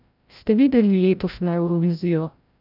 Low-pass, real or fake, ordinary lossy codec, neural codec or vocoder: 5.4 kHz; fake; none; codec, 16 kHz, 0.5 kbps, FreqCodec, larger model